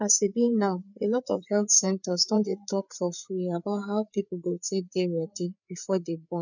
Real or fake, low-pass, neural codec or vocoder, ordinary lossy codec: fake; 7.2 kHz; codec, 16 kHz, 4 kbps, FreqCodec, larger model; none